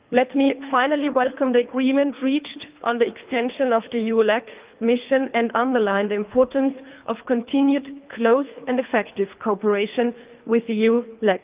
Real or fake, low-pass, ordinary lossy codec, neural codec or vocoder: fake; 3.6 kHz; Opus, 32 kbps; codec, 24 kHz, 3 kbps, HILCodec